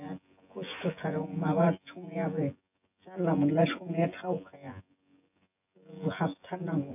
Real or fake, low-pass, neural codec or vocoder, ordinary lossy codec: fake; 3.6 kHz; vocoder, 24 kHz, 100 mel bands, Vocos; none